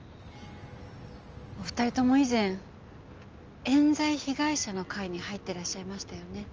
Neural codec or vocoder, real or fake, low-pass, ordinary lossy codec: none; real; 7.2 kHz; Opus, 24 kbps